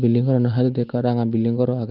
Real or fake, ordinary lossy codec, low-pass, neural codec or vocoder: real; Opus, 32 kbps; 5.4 kHz; none